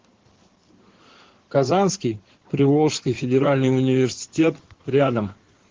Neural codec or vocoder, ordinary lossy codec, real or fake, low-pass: codec, 16 kHz in and 24 kHz out, 2.2 kbps, FireRedTTS-2 codec; Opus, 16 kbps; fake; 7.2 kHz